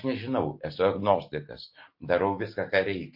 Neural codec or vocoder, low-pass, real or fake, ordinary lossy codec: none; 5.4 kHz; real; MP3, 32 kbps